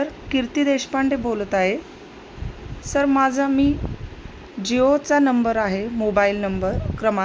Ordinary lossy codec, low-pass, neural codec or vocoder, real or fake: none; none; none; real